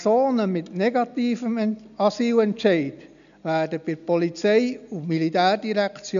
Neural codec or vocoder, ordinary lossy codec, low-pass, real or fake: none; none; 7.2 kHz; real